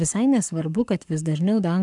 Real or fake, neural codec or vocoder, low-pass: fake; codec, 44.1 kHz, 7.8 kbps, Pupu-Codec; 10.8 kHz